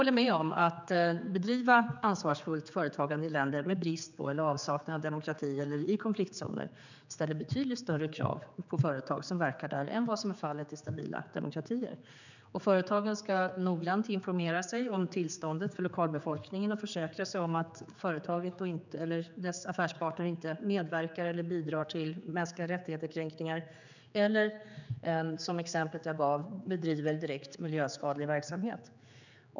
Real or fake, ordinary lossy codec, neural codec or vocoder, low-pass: fake; none; codec, 16 kHz, 4 kbps, X-Codec, HuBERT features, trained on general audio; 7.2 kHz